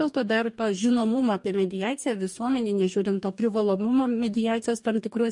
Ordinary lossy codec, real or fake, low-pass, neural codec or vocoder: MP3, 48 kbps; fake; 10.8 kHz; codec, 44.1 kHz, 2.6 kbps, DAC